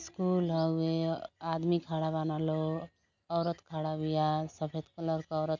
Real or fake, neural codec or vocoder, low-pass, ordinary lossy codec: real; none; 7.2 kHz; none